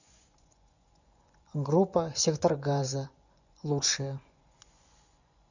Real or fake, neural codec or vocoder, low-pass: fake; vocoder, 24 kHz, 100 mel bands, Vocos; 7.2 kHz